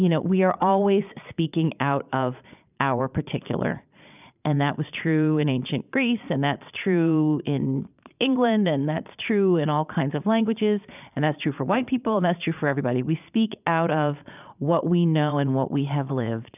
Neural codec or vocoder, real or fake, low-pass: vocoder, 22.05 kHz, 80 mel bands, Vocos; fake; 3.6 kHz